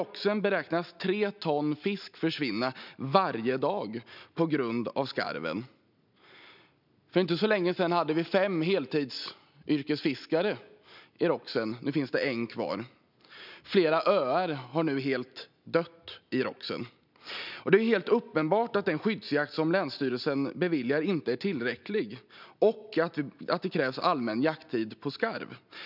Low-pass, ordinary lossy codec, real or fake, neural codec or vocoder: 5.4 kHz; none; real; none